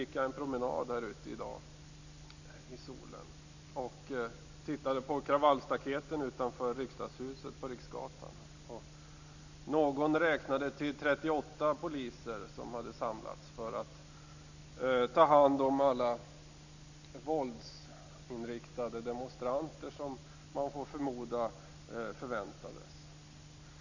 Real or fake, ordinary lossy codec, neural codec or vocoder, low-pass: real; none; none; 7.2 kHz